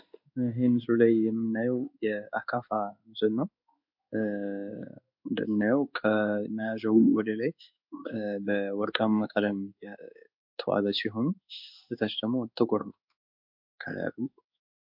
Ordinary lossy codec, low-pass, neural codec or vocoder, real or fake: MP3, 48 kbps; 5.4 kHz; codec, 16 kHz in and 24 kHz out, 1 kbps, XY-Tokenizer; fake